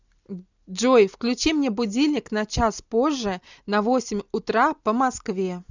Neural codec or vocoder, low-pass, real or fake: none; 7.2 kHz; real